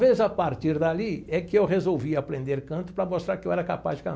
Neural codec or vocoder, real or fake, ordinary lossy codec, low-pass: none; real; none; none